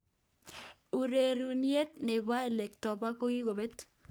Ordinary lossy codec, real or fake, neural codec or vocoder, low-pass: none; fake; codec, 44.1 kHz, 3.4 kbps, Pupu-Codec; none